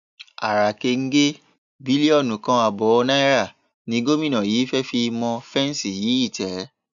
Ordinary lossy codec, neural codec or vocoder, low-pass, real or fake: none; none; 7.2 kHz; real